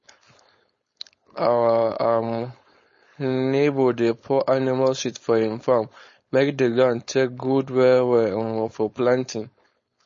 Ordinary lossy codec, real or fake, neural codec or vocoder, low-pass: MP3, 32 kbps; fake; codec, 16 kHz, 4.8 kbps, FACodec; 7.2 kHz